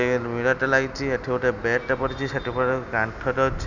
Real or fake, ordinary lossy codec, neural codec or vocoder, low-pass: real; none; none; 7.2 kHz